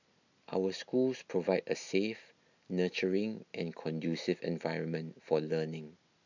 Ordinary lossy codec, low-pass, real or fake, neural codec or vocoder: none; 7.2 kHz; real; none